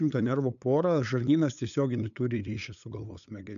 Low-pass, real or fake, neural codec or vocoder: 7.2 kHz; fake; codec, 16 kHz, 8 kbps, FunCodec, trained on LibriTTS, 25 frames a second